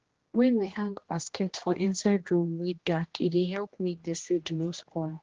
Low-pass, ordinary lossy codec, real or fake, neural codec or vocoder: 7.2 kHz; Opus, 16 kbps; fake; codec, 16 kHz, 1 kbps, X-Codec, HuBERT features, trained on general audio